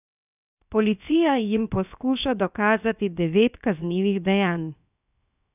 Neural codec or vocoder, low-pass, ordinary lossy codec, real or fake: codec, 16 kHz, 0.7 kbps, FocalCodec; 3.6 kHz; none; fake